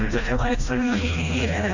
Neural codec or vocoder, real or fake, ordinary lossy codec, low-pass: codec, 16 kHz, 1 kbps, FreqCodec, smaller model; fake; none; 7.2 kHz